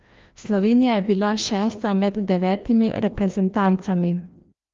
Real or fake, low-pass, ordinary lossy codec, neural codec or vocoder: fake; 7.2 kHz; Opus, 32 kbps; codec, 16 kHz, 1 kbps, FreqCodec, larger model